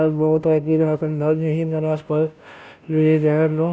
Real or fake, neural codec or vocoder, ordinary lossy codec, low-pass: fake; codec, 16 kHz, 0.5 kbps, FunCodec, trained on Chinese and English, 25 frames a second; none; none